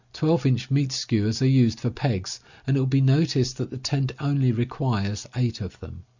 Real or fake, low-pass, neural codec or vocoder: real; 7.2 kHz; none